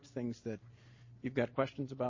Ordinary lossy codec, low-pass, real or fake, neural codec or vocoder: MP3, 32 kbps; 7.2 kHz; real; none